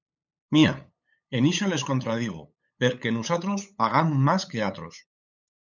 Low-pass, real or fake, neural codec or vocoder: 7.2 kHz; fake; codec, 16 kHz, 8 kbps, FunCodec, trained on LibriTTS, 25 frames a second